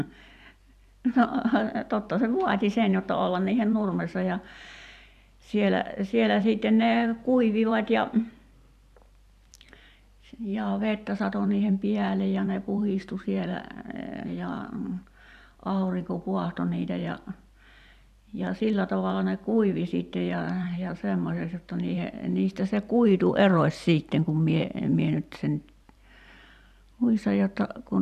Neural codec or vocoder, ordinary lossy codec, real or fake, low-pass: vocoder, 44.1 kHz, 128 mel bands every 512 samples, BigVGAN v2; none; fake; 14.4 kHz